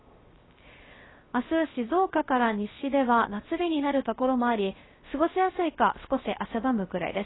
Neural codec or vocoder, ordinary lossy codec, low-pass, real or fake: codec, 16 kHz, 0.3 kbps, FocalCodec; AAC, 16 kbps; 7.2 kHz; fake